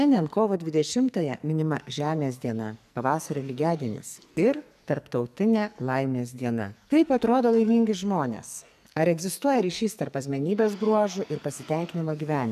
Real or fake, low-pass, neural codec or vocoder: fake; 14.4 kHz; codec, 44.1 kHz, 2.6 kbps, SNAC